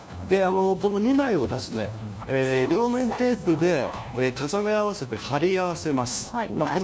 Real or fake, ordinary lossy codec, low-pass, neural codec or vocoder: fake; none; none; codec, 16 kHz, 1 kbps, FunCodec, trained on LibriTTS, 50 frames a second